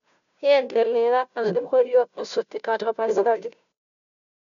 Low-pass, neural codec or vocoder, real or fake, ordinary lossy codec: 7.2 kHz; codec, 16 kHz, 0.5 kbps, FunCodec, trained on Chinese and English, 25 frames a second; fake; none